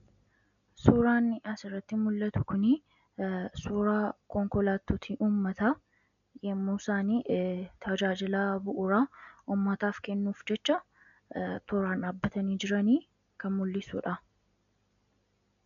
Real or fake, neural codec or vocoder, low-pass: real; none; 7.2 kHz